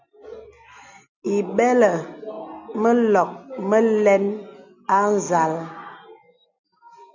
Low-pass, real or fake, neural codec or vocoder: 7.2 kHz; real; none